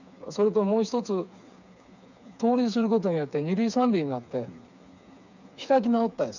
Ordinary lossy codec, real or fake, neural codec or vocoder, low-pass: none; fake; codec, 16 kHz, 4 kbps, FreqCodec, smaller model; 7.2 kHz